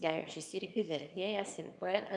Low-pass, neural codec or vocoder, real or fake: 10.8 kHz; codec, 24 kHz, 0.9 kbps, WavTokenizer, small release; fake